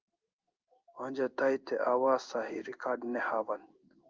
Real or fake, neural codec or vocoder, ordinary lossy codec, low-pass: fake; codec, 16 kHz in and 24 kHz out, 1 kbps, XY-Tokenizer; Opus, 24 kbps; 7.2 kHz